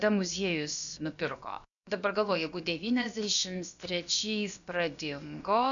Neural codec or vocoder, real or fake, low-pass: codec, 16 kHz, about 1 kbps, DyCAST, with the encoder's durations; fake; 7.2 kHz